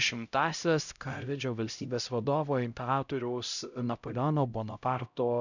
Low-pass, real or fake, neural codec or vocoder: 7.2 kHz; fake; codec, 16 kHz, 0.5 kbps, X-Codec, HuBERT features, trained on LibriSpeech